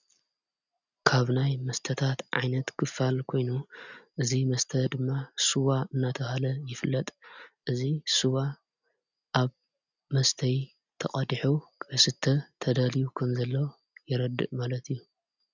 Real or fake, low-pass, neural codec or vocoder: real; 7.2 kHz; none